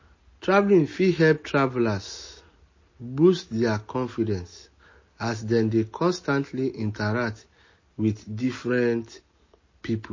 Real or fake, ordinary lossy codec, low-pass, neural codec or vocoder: real; MP3, 32 kbps; 7.2 kHz; none